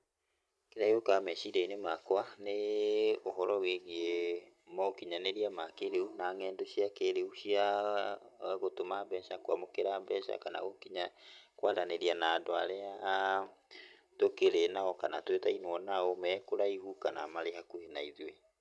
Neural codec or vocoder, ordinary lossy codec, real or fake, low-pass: none; none; real; none